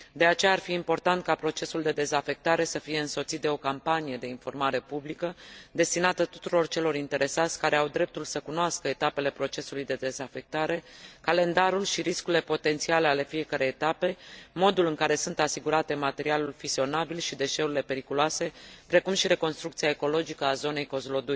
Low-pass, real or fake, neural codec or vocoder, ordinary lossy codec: none; real; none; none